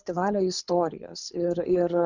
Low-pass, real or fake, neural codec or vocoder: 7.2 kHz; fake; codec, 24 kHz, 6 kbps, HILCodec